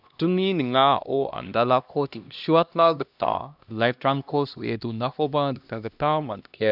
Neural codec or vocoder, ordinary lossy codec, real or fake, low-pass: codec, 16 kHz, 1 kbps, X-Codec, HuBERT features, trained on LibriSpeech; none; fake; 5.4 kHz